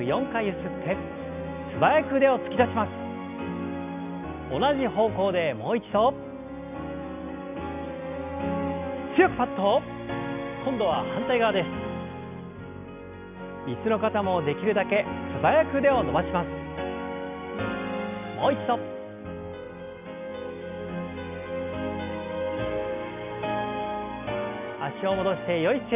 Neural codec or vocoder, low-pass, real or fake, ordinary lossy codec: none; 3.6 kHz; real; none